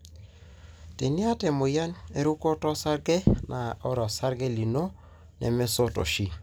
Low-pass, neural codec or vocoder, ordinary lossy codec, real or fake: none; none; none; real